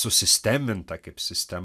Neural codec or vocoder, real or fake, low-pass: none; real; 14.4 kHz